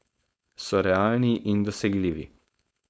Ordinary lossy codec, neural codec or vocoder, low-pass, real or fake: none; codec, 16 kHz, 4.8 kbps, FACodec; none; fake